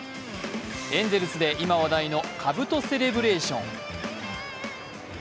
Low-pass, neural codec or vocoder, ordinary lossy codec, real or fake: none; none; none; real